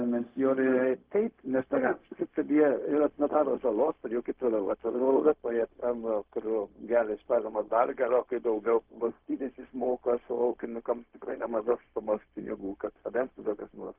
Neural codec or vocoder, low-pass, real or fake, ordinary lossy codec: codec, 16 kHz, 0.4 kbps, LongCat-Audio-Codec; 3.6 kHz; fake; Opus, 24 kbps